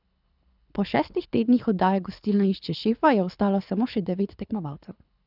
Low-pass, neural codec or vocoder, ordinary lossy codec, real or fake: 5.4 kHz; codec, 24 kHz, 6 kbps, HILCodec; none; fake